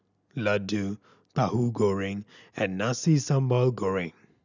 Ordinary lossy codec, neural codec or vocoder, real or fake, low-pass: none; none; real; 7.2 kHz